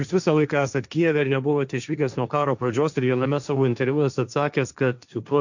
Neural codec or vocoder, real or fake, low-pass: codec, 16 kHz, 1.1 kbps, Voila-Tokenizer; fake; 7.2 kHz